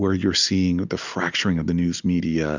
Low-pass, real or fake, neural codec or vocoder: 7.2 kHz; real; none